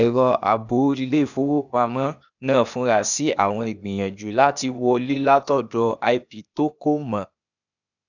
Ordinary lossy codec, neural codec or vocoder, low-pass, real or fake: none; codec, 16 kHz, 0.8 kbps, ZipCodec; 7.2 kHz; fake